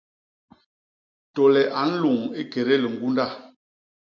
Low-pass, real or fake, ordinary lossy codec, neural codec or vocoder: 7.2 kHz; real; MP3, 64 kbps; none